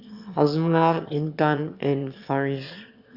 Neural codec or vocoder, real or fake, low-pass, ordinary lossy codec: autoencoder, 22.05 kHz, a latent of 192 numbers a frame, VITS, trained on one speaker; fake; 5.4 kHz; Opus, 64 kbps